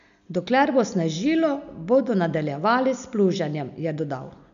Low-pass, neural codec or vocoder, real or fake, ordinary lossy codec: 7.2 kHz; none; real; none